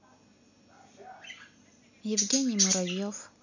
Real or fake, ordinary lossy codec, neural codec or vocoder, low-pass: real; none; none; 7.2 kHz